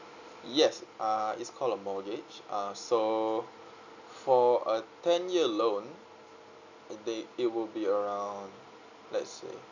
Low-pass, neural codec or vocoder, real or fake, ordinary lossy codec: 7.2 kHz; none; real; none